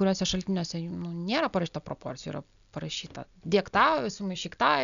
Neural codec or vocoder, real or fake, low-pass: none; real; 7.2 kHz